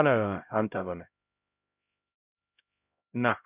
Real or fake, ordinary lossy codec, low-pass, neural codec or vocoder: fake; none; 3.6 kHz; codec, 16 kHz, 0.5 kbps, X-Codec, HuBERT features, trained on LibriSpeech